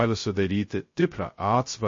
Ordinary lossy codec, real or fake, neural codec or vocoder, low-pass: MP3, 32 kbps; fake; codec, 16 kHz, 0.2 kbps, FocalCodec; 7.2 kHz